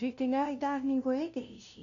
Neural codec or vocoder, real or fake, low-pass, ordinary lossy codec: codec, 16 kHz, 0.5 kbps, FunCodec, trained on LibriTTS, 25 frames a second; fake; 7.2 kHz; none